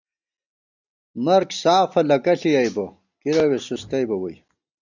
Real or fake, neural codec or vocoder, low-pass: real; none; 7.2 kHz